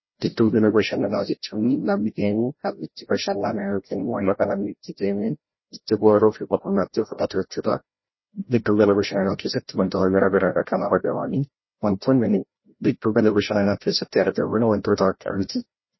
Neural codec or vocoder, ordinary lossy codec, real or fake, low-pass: codec, 16 kHz, 0.5 kbps, FreqCodec, larger model; MP3, 24 kbps; fake; 7.2 kHz